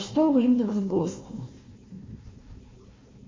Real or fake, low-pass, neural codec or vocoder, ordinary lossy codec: fake; 7.2 kHz; codec, 16 kHz, 1 kbps, FunCodec, trained on Chinese and English, 50 frames a second; MP3, 32 kbps